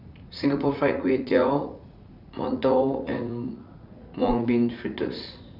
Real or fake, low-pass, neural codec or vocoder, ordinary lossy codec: fake; 5.4 kHz; vocoder, 44.1 kHz, 80 mel bands, Vocos; none